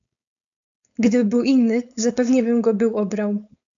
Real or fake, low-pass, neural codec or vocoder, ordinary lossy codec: fake; 7.2 kHz; codec, 16 kHz, 4.8 kbps, FACodec; AAC, 64 kbps